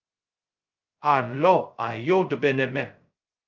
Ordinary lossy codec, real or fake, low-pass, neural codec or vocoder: Opus, 24 kbps; fake; 7.2 kHz; codec, 16 kHz, 0.2 kbps, FocalCodec